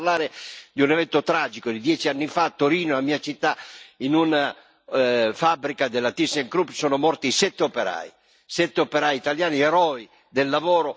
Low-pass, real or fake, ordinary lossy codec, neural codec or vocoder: none; real; none; none